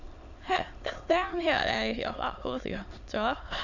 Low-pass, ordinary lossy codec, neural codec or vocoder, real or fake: 7.2 kHz; none; autoencoder, 22.05 kHz, a latent of 192 numbers a frame, VITS, trained on many speakers; fake